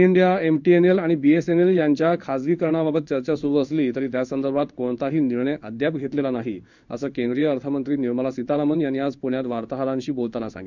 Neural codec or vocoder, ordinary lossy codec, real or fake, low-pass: codec, 16 kHz in and 24 kHz out, 1 kbps, XY-Tokenizer; none; fake; 7.2 kHz